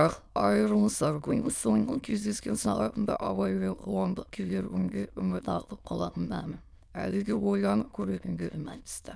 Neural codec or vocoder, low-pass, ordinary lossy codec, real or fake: autoencoder, 22.05 kHz, a latent of 192 numbers a frame, VITS, trained on many speakers; none; none; fake